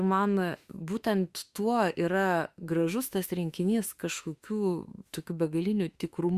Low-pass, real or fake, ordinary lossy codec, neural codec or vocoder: 14.4 kHz; fake; Opus, 64 kbps; autoencoder, 48 kHz, 32 numbers a frame, DAC-VAE, trained on Japanese speech